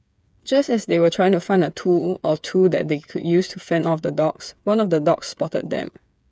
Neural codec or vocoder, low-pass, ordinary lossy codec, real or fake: codec, 16 kHz, 8 kbps, FreqCodec, smaller model; none; none; fake